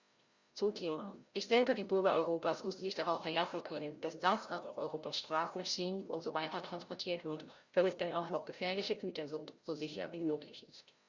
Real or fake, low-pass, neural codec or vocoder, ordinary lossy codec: fake; 7.2 kHz; codec, 16 kHz, 0.5 kbps, FreqCodec, larger model; Opus, 64 kbps